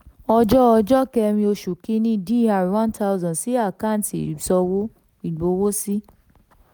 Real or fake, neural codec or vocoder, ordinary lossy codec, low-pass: real; none; none; none